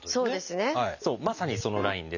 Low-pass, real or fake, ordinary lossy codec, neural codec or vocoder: 7.2 kHz; real; none; none